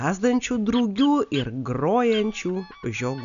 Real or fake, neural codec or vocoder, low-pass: real; none; 7.2 kHz